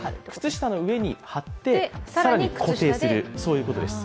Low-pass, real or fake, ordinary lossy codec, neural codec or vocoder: none; real; none; none